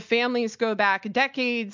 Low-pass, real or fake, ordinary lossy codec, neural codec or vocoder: 7.2 kHz; real; MP3, 64 kbps; none